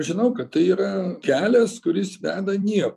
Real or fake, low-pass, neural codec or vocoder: fake; 14.4 kHz; vocoder, 44.1 kHz, 128 mel bands every 256 samples, BigVGAN v2